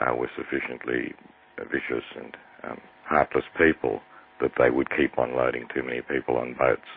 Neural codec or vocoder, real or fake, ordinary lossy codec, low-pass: none; real; MP3, 24 kbps; 5.4 kHz